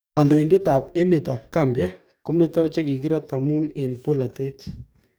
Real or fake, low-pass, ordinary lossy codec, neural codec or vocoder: fake; none; none; codec, 44.1 kHz, 2.6 kbps, DAC